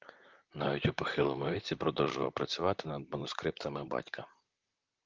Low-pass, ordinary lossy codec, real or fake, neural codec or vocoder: 7.2 kHz; Opus, 24 kbps; fake; vocoder, 44.1 kHz, 128 mel bands, Pupu-Vocoder